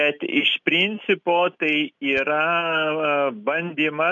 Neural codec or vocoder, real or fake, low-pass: none; real; 7.2 kHz